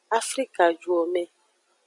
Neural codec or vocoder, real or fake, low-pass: none; real; 10.8 kHz